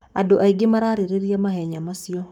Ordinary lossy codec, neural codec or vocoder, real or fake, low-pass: none; codec, 44.1 kHz, 7.8 kbps, Pupu-Codec; fake; 19.8 kHz